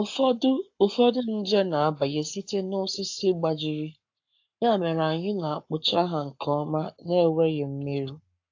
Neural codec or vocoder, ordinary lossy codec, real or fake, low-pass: codec, 44.1 kHz, 7.8 kbps, Pupu-Codec; AAC, 48 kbps; fake; 7.2 kHz